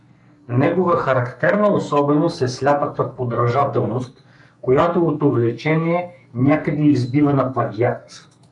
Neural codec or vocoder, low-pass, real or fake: codec, 44.1 kHz, 2.6 kbps, SNAC; 10.8 kHz; fake